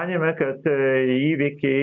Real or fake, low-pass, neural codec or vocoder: real; 7.2 kHz; none